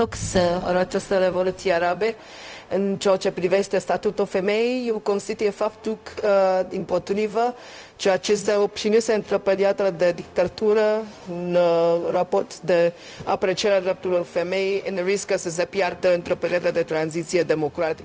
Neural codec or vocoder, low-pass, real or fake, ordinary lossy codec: codec, 16 kHz, 0.4 kbps, LongCat-Audio-Codec; none; fake; none